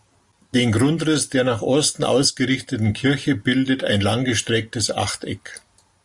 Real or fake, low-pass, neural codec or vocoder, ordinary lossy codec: real; 10.8 kHz; none; Opus, 64 kbps